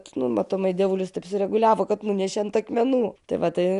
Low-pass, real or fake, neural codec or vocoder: 10.8 kHz; real; none